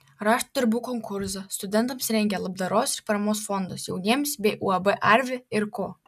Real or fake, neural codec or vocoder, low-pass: real; none; 14.4 kHz